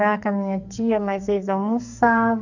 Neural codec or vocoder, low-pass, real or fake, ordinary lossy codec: codec, 44.1 kHz, 2.6 kbps, SNAC; 7.2 kHz; fake; none